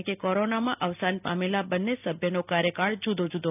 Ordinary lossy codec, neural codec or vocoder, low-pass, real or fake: none; none; 3.6 kHz; real